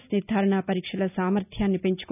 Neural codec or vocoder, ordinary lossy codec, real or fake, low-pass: none; none; real; 3.6 kHz